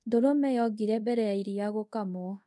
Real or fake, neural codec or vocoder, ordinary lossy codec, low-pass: fake; codec, 24 kHz, 0.5 kbps, DualCodec; none; none